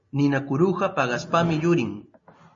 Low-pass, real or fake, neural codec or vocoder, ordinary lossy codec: 7.2 kHz; real; none; MP3, 32 kbps